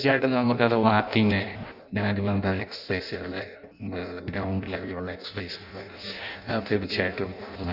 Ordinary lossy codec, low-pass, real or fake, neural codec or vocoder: none; 5.4 kHz; fake; codec, 16 kHz in and 24 kHz out, 0.6 kbps, FireRedTTS-2 codec